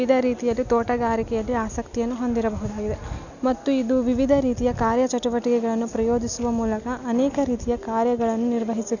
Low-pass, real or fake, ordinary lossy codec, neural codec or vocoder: 7.2 kHz; real; none; none